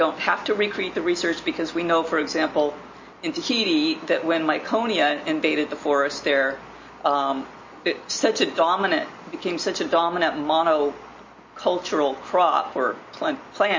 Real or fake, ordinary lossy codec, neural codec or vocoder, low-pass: real; MP3, 32 kbps; none; 7.2 kHz